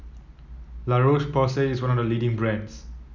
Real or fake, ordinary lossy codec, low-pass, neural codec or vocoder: real; none; 7.2 kHz; none